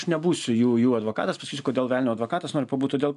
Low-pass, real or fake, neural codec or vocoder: 10.8 kHz; real; none